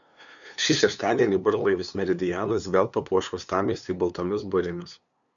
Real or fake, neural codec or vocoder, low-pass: fake; codec, 16 kHz, 2 kbps, FunCodec, trained on LibriTTS, 25 frames a second; 7.2 kHz